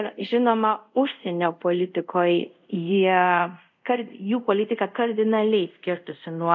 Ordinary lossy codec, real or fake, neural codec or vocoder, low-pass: MP3, 64 kbps; fake; codec, 24 kHz, 0.5 kbps, DualCodec; 7.2 kHz